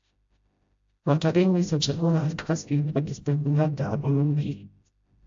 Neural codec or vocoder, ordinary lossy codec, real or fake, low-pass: codec, 16 kHz, 0.5 kbps, FreqCodec, smaller model; AAC, 64 kbps; fake; 7.2 kHz